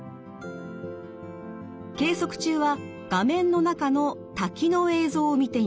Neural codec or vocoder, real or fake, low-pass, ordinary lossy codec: none; real; none; none